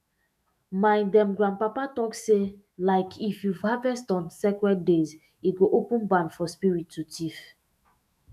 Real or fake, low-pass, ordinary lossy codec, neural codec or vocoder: fake; 14.4 kHz; none; autoencoder, 48 kHz, 128 numbers a frame, DAC-VAE, trained on Japanese speech